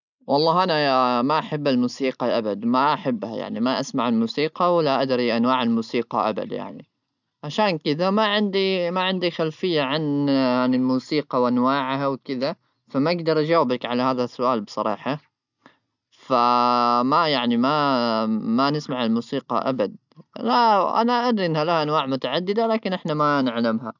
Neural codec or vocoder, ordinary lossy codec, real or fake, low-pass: none; none; real; 7.2 kHz